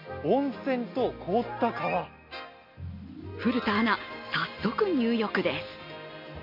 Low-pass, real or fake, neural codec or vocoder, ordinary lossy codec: 5.4 kHz; real; none; MP3, 32 kbps